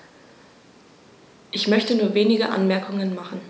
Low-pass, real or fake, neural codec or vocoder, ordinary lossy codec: none; real; none; none